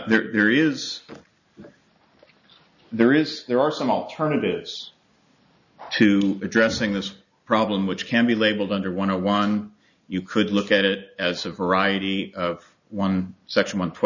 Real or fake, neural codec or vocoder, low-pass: real; none; 7.2 kHz